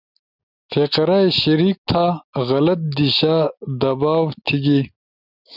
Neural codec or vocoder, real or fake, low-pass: none; real; 5.4 kHz